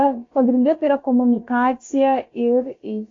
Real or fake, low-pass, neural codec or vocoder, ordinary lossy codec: fake; 7.2 kHz; codec, 16 kHz, about 1 kbps, DyCAST, with the encoder's durations; AAC, 32 kbps